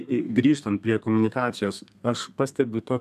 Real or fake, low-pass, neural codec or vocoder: fake; 14.4 kHz; codec, 32 kHz, 1.9 kbps, SNAC